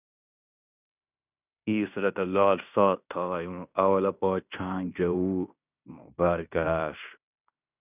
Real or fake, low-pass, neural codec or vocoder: fake; 3.6 kHz; codec, 16 kHz in and 24 kHz out, 0.9 kbps, LongCat-Audio-Codec, fine tuned four codebook decoder